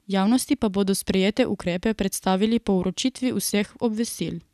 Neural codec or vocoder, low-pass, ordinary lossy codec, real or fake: none; 14.4 kHz; none; real